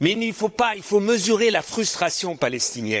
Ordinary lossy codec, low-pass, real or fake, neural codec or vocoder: none; none; fake; codec, 16 kHz, 16 kbps, FunCodec, trained on Chinese and English, 50 frames a second